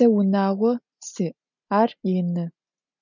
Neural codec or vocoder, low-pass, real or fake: none; 7.2 kHz; real